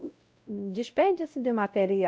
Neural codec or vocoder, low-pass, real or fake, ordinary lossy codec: codec, 16 kHz, 0.5 kbps, X-Codec, WavLM features, trained on Multilingual LibriSpeech; none; fake; none